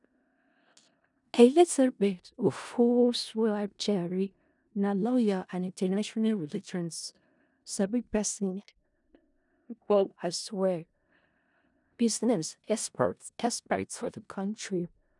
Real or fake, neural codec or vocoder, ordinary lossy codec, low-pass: fake; codec, 16 kHz in and 24 kHz out, 0.4 kbps, LongCat-Audio-Codec, four codebook decoder; none; 10.8 kHz